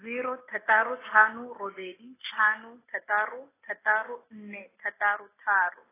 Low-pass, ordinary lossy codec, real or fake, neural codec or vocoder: 3.6 kHz; AAC, 16 kbps; real; none